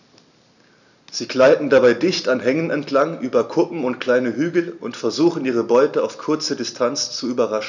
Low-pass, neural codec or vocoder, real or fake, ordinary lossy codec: 7.2 kHz; none; real; none